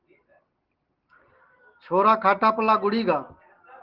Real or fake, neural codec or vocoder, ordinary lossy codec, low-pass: real; none; Opus, 16 kbps; 5.4 kHz